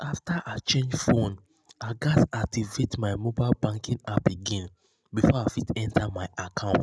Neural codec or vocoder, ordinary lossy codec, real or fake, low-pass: none; none; real; none